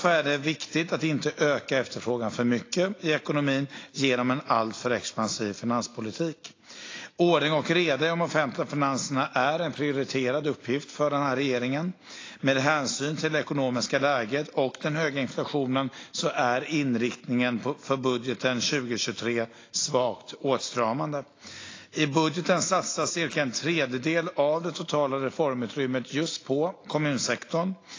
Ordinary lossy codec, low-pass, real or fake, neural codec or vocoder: AAC, 32 kbps; 7.2 kHz; real; none